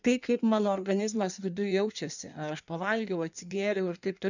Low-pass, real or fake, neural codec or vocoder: 7.2 kHz; fake; codec, 16 kHz in and 24 kHz out, 1.1 kbps, FireRedTTS-2 codec